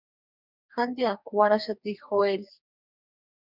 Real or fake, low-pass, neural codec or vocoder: fake; 5.4 kHz; codec, 44.1 kHz, 2.6 kbps, DAC